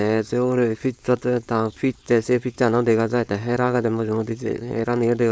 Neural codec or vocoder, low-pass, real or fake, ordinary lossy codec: codec, 16 kHz, 4.8 kbps, FACodec; none; fake; none